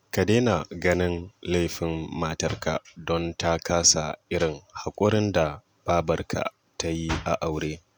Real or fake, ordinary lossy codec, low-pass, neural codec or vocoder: real; none; none; none